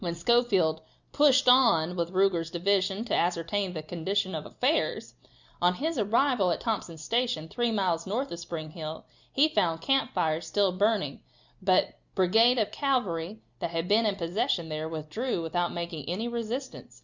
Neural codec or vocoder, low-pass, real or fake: none; 7.2 kHz; real